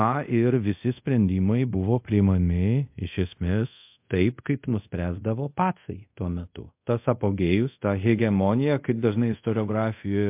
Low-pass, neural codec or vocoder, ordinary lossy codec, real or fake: 3.6 kHz; codec, 24 kHz, 0.5 kbps, DualCodec; MP3, 32 kbps; fake